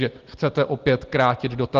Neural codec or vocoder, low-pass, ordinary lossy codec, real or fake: none; 7.2 kHz; Opus, 16 kbps; real